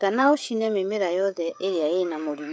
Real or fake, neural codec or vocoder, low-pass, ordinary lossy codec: fake; codec, 16 kHz, 16 kbps, FreqCodec, smaller model; none; none